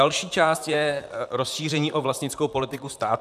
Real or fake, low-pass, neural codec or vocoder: fake; 14.4 kHz; vocoder, 44.1 kHz, 128 mel bands, Pupu-Vocoder